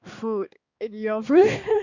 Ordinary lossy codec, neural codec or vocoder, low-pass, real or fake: Opus, 64 kbps; codec, 16 kHz, 2 kbps, X-Codec, HuBERT features, trained on balanced general audio; 7.2 kHz; fake